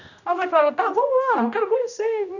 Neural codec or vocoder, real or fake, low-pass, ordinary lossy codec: codec, 16 kHz, 1 kbps, X-Codec, HuBERT features, trained on general audio; fake; 7.2 kHz; none